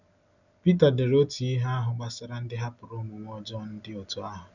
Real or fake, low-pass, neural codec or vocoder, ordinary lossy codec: real; 7.2 kHz; none; none